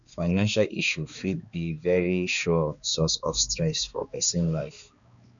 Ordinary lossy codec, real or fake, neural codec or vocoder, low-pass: none; fake; codec, 16 kHz, 2 kbps, X-Codec, HuBERT features, trained on balanced general audio; 7.2 kHz